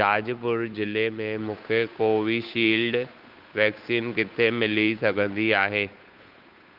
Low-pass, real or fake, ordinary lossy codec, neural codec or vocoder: 5.4 kHz; fake; Opus, 24 kbps; codec, 16 kHz, 8 kbps, FunCodec, trained on Chinese and English, 25 frames a second